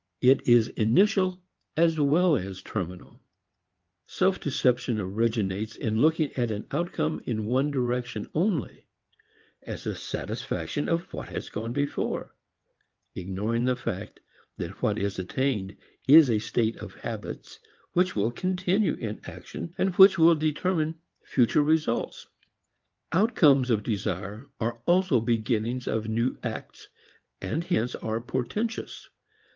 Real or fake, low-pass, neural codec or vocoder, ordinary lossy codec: fake; 7.2 kHz; vocoder, 22.05 kHz, 80 mel bands, WaveNeXt; Opus, 32 kbps